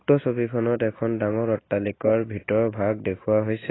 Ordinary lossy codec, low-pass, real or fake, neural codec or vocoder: AAC, 16 kbps; 7.2 kHz; real; none